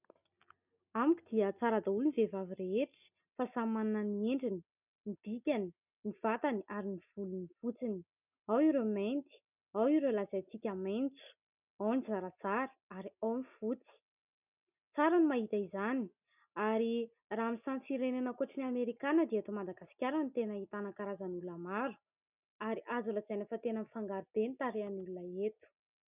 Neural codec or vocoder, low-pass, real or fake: none; 3.6 kHz; real